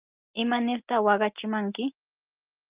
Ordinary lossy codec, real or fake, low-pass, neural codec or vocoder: Opus, 24 kbps; real; 3.6 kHz; none